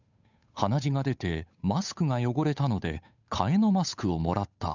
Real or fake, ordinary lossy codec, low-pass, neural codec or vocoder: fake; none; 7.2 kHz; codec, 16 kHz, 8 kbps, FunCodec, trained on Chinese and English, 25 frames a second